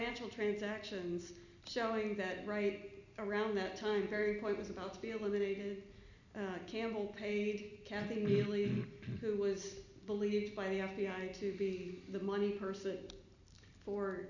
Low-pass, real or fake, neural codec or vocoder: 7.2 kHz; real; none